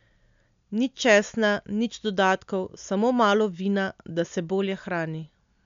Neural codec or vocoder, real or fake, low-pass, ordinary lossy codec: none; real; 7.2 kHz; MP3, 64 kbps